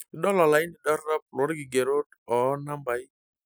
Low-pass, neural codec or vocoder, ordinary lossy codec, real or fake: none; none; none; real